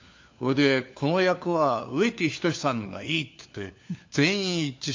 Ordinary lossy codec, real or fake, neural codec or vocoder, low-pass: MP3, 48 kbps; fake; codec, 16 kHz, 4 kbps, FunCodec, trained on LibriTTS, 50 frames a second; 7.2 kHz